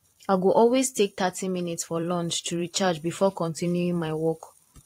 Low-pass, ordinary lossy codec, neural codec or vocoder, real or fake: 19.8 kHz; AAC, 48 kbps; vocoder, 44.1 kHz, 128 mel bands every 512 samples, BigVGAN v2; fake